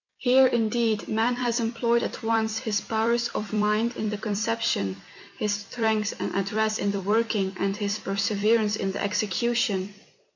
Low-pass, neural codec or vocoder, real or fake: 7.2 kHz; vocoder, 44.1 kHz, 128 mel bands every 256 samples, BigVGAN v2; fake